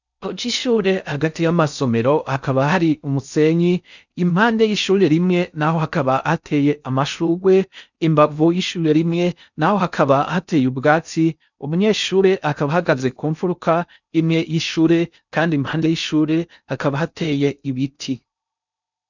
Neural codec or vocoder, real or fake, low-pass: codec, 16 kHz in and 24 kHz out, 0.6 kbps, FocalCodec, streaming, 4096 codes; fake; 7.2 kHz